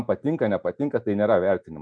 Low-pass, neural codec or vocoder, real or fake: 9.9 kHz; none; real